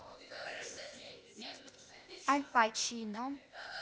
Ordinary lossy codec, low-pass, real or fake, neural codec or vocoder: none; none; fake; codec, 16 kHz, 0.8 kbps, ZipCodec